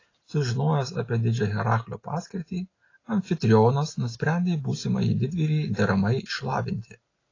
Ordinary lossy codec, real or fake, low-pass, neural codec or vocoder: AAC, 32 kbps; real; 7.2 kHz; none